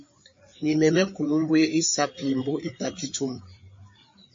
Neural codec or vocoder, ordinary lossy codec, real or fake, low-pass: codec, 16 kHz, 4 kbps, FreqCodec, larger model; MP3, 32 kbps; fake; 7.2 kHz